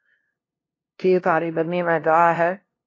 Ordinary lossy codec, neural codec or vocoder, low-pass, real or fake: AAC, 32 kbps; codec, 16 kHz, 0.5 kbps, FunCodec, trained on LibriTTS, 25 frames a second; 7.2 kHz; fake